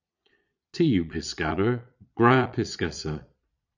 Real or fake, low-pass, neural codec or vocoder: fake; 7.2 kHz; vocoder, 22.05 kHz, 80 mel bands, Vocos